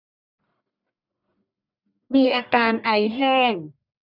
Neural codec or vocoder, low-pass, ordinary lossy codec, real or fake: codec, 44.1 kHz, 1.7 kbps, Pupu-Codec; 5.4 kHz; none; fake